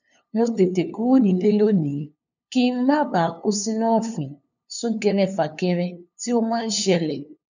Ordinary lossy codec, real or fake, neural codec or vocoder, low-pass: none; fake; codec, 16 kHz, 2 kbps, FunCodec, trained on LibriTTS, 25 frames a second; 7.2 kHz